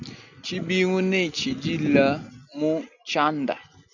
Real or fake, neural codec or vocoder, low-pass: real; none; 7.2 kHz